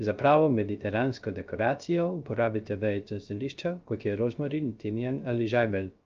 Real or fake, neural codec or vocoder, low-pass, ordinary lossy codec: fake; codec, 16 kHz, 0.3 kbps, FocalCodec; 7.2 kHz; Opus, 24 kbps